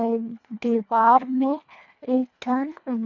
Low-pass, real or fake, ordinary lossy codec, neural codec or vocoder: 7.2 kHz; fake; none; codec, 24 kHz, 1.5 kbps, HILCodec